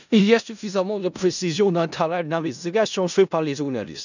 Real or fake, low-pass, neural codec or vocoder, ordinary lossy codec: fake; 7.2 kHz; codec, 16 kHz in and 24 kHz out, 0.4 kbps, LongCat-Audio-Codec, four codebook decoder; none